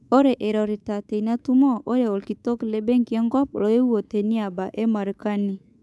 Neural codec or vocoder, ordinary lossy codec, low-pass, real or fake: codec, 24 kHz, 3.1 kbps, DualCodec; none; none; fake